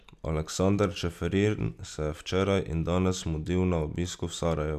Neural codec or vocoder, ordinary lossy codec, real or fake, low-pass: none; none; real; 14.4 kHz